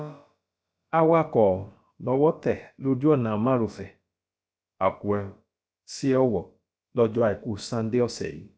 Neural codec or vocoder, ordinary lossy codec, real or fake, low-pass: codec, 16 kHz, about 1 kbps, DyCAST, with the encoder's durations; none; fake; none